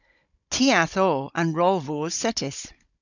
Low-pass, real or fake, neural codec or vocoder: 7.2 kHz; fake; codec, 16 kHz, 16 kbps, FunCodec, trained on Chinese and English, 50 frames a second